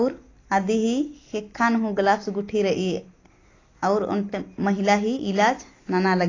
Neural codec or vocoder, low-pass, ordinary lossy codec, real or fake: none; 7.2 kHz; AAC, 32 kbps; real